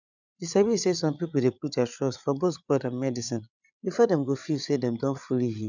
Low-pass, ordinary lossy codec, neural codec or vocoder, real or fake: 7.2 kHz; none; codec, 16 kHz, 8 kbps, FreqCodec, larger model; fake